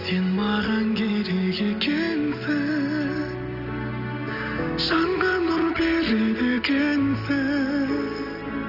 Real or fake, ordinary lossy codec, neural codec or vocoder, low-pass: real; none; none; 5.4 kHz